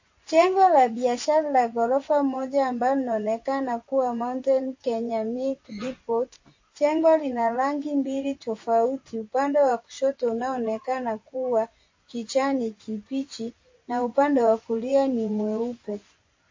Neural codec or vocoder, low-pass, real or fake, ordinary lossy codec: vocoder, 24 kHz, 100 mel bands, Vocos; 7.2 kHz; fake; MP3, 32 kbps